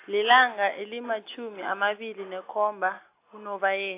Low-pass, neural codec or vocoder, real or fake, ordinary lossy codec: 3.6 kHz; none; real; AAC, 24 kbps